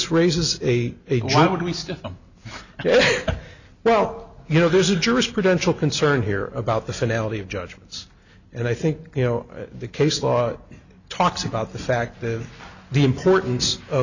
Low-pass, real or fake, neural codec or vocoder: 7.2 kHz; real; none